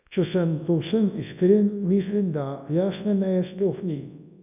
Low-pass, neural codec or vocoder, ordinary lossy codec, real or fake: 3.6 kHz; codec, 24 kHz, 0.9 kbps, WavTokenizer, large speech release; none; fake